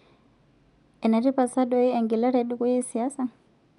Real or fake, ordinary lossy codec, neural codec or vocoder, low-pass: real; none; none; 10.8 kHz